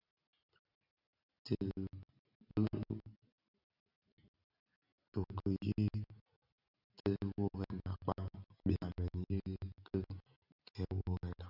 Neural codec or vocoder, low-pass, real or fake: none; 5.4 kHz; real